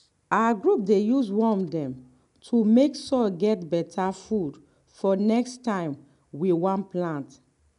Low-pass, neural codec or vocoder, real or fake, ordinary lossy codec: 10.8 kHz; none; real; none